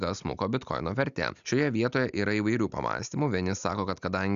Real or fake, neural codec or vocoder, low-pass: real; none; 7.2 kHz